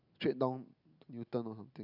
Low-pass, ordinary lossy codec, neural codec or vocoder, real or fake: 5.4 kHz; none; none; real